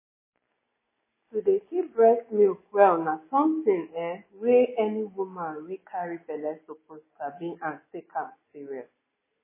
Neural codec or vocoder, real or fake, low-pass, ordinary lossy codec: none; real; 3.6 kHz; MP3, 16 kbps